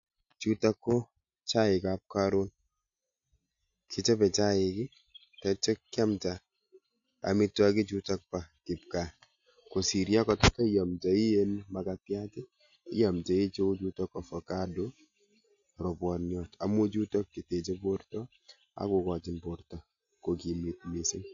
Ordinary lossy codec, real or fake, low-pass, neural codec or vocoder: MP3, 64 kbps; real; 7.2 kHz; none